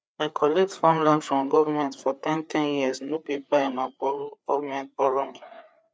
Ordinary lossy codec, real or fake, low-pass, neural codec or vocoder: none; fake; none; codec, 16 kHz, 4 kbps, FreqCodec, larger model